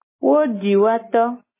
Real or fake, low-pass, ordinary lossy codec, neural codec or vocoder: real; 3.6 kHz; MP3, 16 kbps; none